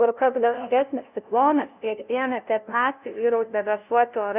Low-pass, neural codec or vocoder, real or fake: 3.6 kHz; codec, 16 kHz, 0.5 kbps, FunCodec, trained on LibriTTS, 25 frames a second; fake